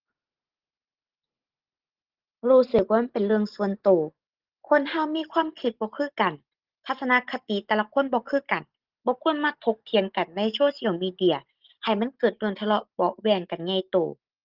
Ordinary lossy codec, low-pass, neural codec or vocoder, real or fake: Opus, 16 kbps; 5.4 kHz; none; real